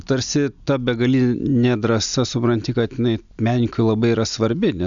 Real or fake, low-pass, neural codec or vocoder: real; 7.2 kHz; none